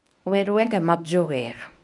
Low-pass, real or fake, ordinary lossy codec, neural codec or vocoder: 10.8 kHz; fake; none; codec, 24 kHz, 0.9 kbps, WavTokenizer, medium speech release version 1